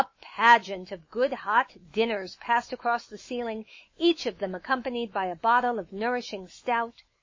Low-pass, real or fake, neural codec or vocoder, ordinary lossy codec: 7.2 kHz; fake; autoencoder, 48 kHz, 128 numbers a frame, DAC-VAE, trained on Japanese speech; MP3, 32 kbps